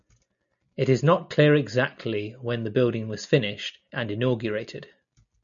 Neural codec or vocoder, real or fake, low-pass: none; real; 7.2 kHz